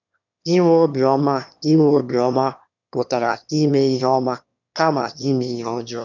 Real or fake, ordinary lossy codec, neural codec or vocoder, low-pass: fake; none; autoencoder, 22.05 kHz, a latent of 192 numbers a frame, VITS, trained on one speaker; 7.2 kHz